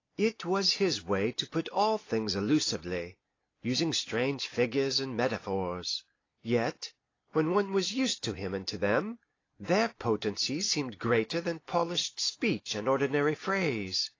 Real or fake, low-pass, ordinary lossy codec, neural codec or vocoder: real; 7.2 kHz; AAC, 32 kbps; none